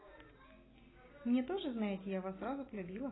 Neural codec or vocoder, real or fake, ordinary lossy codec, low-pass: none; real; AAC, 16 kbps; 7.2 kHz